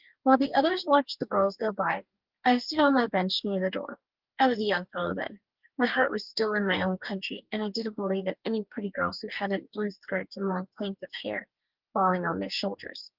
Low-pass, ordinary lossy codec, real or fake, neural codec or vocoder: 5.4 kHz; Opus, 32 kbps; fake; codec, 44.1 kHz, 2.6 kbps, DAC